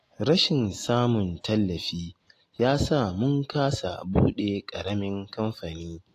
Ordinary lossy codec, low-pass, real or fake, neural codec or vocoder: AAC, 48 kbps; 14.4 kHz; real; none